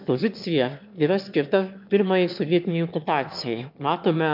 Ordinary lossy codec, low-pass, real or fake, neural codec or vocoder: MP3, 48 kbps; 5.4 kHz; fake; autoencoder, 22.05 kHz, a latent of 192 numbers a frame, VITS, trained on one speaker